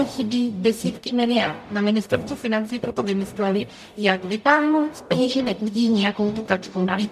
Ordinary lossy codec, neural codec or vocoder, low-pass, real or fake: MP3, 96 kbps; codec, 44.1 kHz, 0.9 kbps, DAC; 14.4 kHz; fake